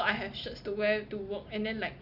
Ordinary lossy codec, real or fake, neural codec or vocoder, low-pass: none; real; none; 5.4 kHz